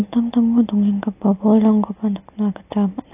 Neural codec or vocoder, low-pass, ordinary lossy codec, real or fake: none; 3.6 kHz; AAC, 32 kbps; real